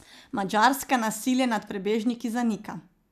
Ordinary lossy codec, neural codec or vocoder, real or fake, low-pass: none; none; real; 14.4 kHz